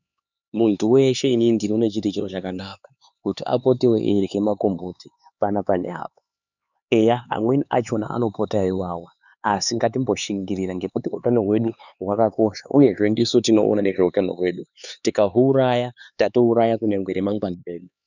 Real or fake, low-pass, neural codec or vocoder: fake; 7.2 kHz; codec, 16 kHz, 4 kbps, X-Codec, HuBERT features, trained on LibriSpeech